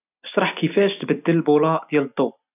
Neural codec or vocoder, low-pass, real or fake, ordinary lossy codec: none; 3.6 kHz; real; none